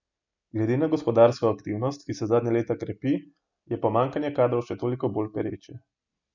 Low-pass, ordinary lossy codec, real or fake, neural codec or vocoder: 7.2 kHz; none; real; none